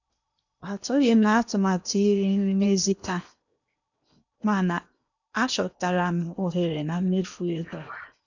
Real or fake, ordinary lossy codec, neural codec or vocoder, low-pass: fake; none; codec, 16 kHz in and 24 kHz out, 0.8 kbps, FocalCodec, streaming, 65536 codes; 7.2 kHz